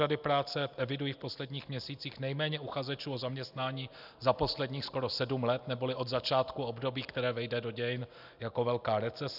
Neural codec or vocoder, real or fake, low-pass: none; real; 5.4 kHz